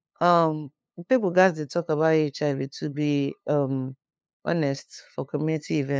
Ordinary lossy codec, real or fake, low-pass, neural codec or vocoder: none; fake; none; codec, 16 kHz, 2 kbps, FunCodec, trained on LibriTTS, 25 frames a second